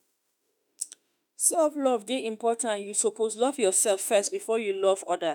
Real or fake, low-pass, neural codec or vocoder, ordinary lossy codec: fake; none; autoencoder, 48 kHz, 32 numbers a frame, DAC-VAE, trained on Japanese speech; none